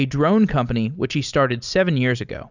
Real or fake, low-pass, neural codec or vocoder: real; 7.2 kHz; none